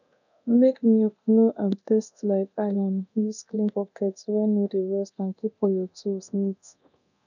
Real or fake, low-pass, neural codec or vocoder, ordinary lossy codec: fake; 7.2 kHz; codec, 24 kHz, 0.9 kbps, DualCodec; none